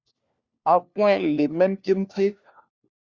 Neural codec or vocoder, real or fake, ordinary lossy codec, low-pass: codec, 16 kHz, 1 kbps, FunCodec, trained on LibriTTS, 50 frames a second; fake; Opus, 64 kbps; 7.2 kHz